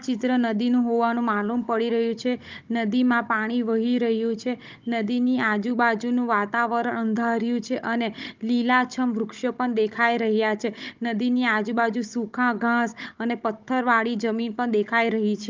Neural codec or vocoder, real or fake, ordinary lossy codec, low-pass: codec, 16 kHz, 16 kbps, FunCodec, trained on Chinese and English, 50 frames a second; fake; Opus, 32 kbps; 7.2 kHz